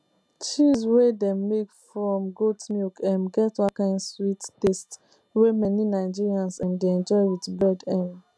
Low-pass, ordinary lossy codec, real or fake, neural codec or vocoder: none; none; real; none